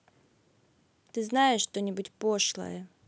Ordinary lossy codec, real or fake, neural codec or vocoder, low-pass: none; real; none; none